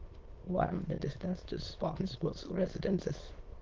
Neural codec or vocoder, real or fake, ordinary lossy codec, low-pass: autoencoder, 22.05 kHz, a latent of 192 numbers a frame, VITS, trained on many speakers; fake; Opus, 16 kbps; 7.2 kHz